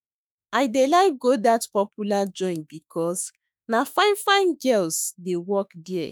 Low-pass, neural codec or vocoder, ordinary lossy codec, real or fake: none; autoencoder, 48 kHz, 32 numbers a frame, DAC-VAE, trained on Japanese speech; none; fake